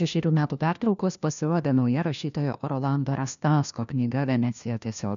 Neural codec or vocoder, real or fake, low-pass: codec, 16 kHz, 1 kbps, FunCodec, trained on LibriTTS, 50 frames a second; fake; 7.2 kHz